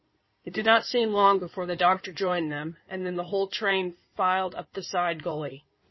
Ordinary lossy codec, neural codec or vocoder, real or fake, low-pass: MP3, 24 kbps; codec, 16 kHz in and 24 kHz out, 2.2 kbps, FireRedTTS-2 codec; fake; 7.2 kHz